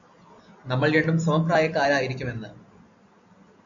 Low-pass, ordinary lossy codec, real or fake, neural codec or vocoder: 7.2 kHz; AAC, 64 kbps; real; none